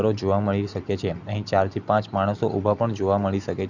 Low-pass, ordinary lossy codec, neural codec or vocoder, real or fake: 7.2 kHz; none; none; real